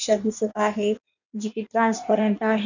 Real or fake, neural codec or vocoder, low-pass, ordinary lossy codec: fake; codec, 16 kHz in and 24 kHz out, 1.1 kbps, FireRedTTS-2 codec; 7.2 kHz; none